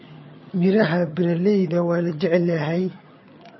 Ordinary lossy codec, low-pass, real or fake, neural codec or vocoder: MP3, 24 kbps; 7.2 kHz; fake; vocoder, 22.05 kHz, 80 mel bands, HiFi-GAN